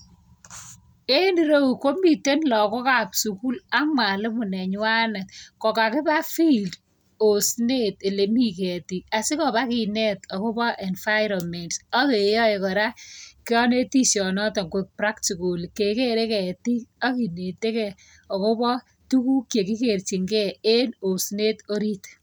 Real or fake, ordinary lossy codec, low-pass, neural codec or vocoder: real; none; none; none